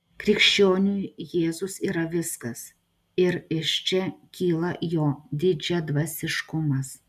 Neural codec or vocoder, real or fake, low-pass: none; real; 14.4 kHz